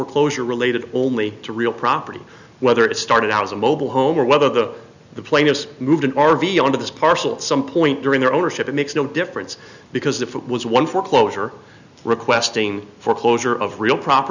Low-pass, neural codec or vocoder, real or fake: 7.2 kHz; none; real